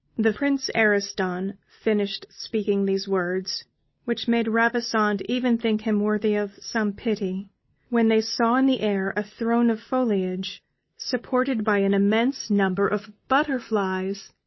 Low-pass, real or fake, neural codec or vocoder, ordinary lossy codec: 7.2 kHz; real; none; MP3, 24 kbps